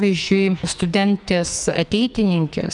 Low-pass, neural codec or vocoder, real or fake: 10.8 kHz; codec, 44.1 kHz, 2.6 kbps, SNAC; fake